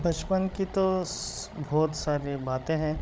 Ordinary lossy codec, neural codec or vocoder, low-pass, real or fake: none; codec, 16 kHz, 16 kbps, FreqCodec, larger model; none; fake